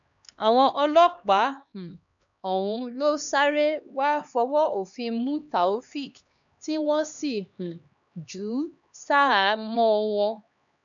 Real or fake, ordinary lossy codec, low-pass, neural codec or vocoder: fake; none; 7.2 kHz; codec, 16 kHz, 2 kbps, X-Codec, HuBERT features, trained on LibriSpeech